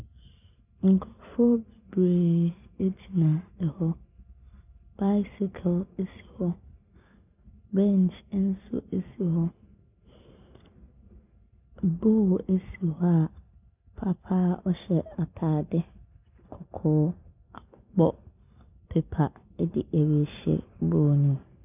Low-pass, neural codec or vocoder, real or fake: 3.6 kHz; none; real